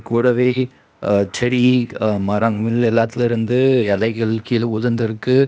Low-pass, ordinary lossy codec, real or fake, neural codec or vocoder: none; none; fake; codec, 16 kHz, 0.8 kbps, ZipCodec